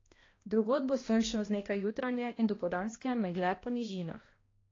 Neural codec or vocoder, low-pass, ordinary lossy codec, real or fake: codec, 16 kHz, 1 kbps, X-Codec, HuBERT features, trained on balanced general audio; 7.2 kHz; AAC, 32 kbps; fake